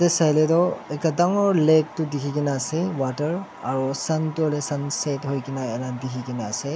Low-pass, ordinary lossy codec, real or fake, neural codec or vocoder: none; none; real; none